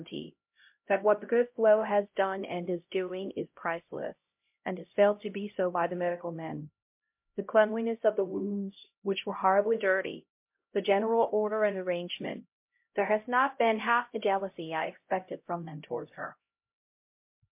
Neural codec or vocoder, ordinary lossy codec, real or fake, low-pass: codec, 16 kHz, 0.5 kbps, X-Codec, HuBERT features, trained on LibriSpeech; MP3, 32 kbps; fake; 3.6 kHz